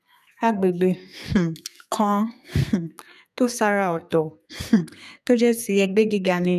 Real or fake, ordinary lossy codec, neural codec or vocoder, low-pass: fake; none; codec, 32 kHz, 1.9 kbps, SNAC; 14.4 kHz